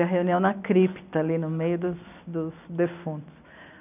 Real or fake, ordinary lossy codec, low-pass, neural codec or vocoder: real; none; 3.6 kHz; none